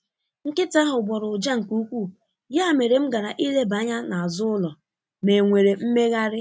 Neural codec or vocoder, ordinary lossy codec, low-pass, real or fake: none; none; none; real